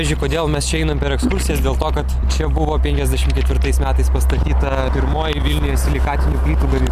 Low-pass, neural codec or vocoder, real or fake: 14.4 kHz; none; real